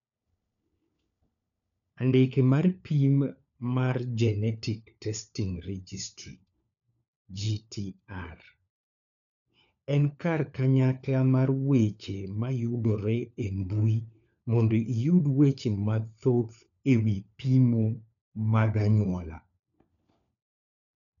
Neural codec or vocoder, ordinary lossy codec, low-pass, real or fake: codec, 16 kHz, 4 kbps, FunCodec, trained on LibriTTS, 50 frames a second; none; 7.2 kHz; fake